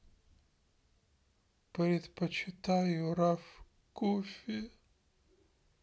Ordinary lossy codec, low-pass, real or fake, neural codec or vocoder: none; none; real; none